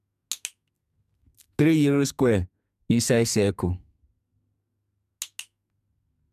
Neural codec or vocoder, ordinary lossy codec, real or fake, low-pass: codec, 44.1 kHz, 2.6 kbps, SNAC; none; fake; 14.4 kHz